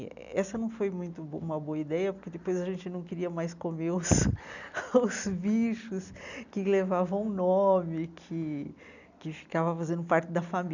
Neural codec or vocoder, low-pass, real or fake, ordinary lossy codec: none; 7.2 kHz; real; none